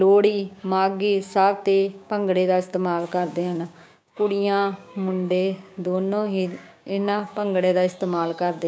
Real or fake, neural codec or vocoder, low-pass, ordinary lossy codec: fake; codec, 16 kHz, 6 kbps, DAC; none; none